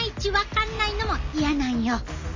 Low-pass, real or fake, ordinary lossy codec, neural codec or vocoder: 7.2 kHz; real; MP3, 64 kbps; none